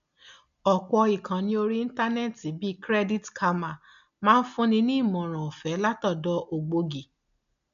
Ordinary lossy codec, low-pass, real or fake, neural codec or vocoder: none; 7.2 kHz; real; none